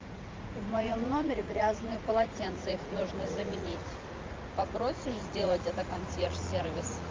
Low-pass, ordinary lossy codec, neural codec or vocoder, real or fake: 7.2 kHz; Opus, 32 kbps; vocoder, 44.1 kHz, 80 mel bands, Vocos; fake